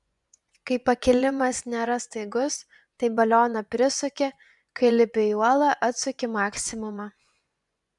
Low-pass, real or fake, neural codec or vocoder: 10.8 kHz; fake; vocoder, 24 kHz, 100 mel bands, Vocos